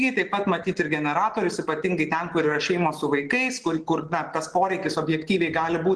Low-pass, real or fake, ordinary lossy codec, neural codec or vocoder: 10.8 kHz; real; Opus, 16 kbps; none